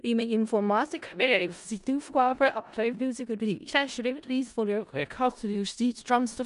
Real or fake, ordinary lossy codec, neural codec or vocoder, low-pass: fake; none; codec, 16 kHz in and 24 kHz out, 0.4 kbps, LongCat-Audio-Codec, four codebook decoder; 10.8 kHz